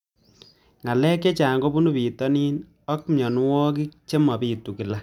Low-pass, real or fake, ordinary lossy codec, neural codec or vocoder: 19.8 kHz; real; none; none